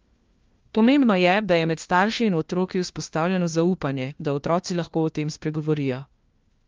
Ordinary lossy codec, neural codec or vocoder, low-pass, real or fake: Opus, 32 kbps; codec, 16 kHz, 1 kbps, FunCodec, trained on LibriTTS, 50 frames a second; 7.2 kHz; fake